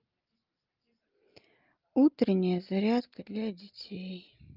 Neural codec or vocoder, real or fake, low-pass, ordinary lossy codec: none; real; 5.4 kHz; Opus, 24 kbps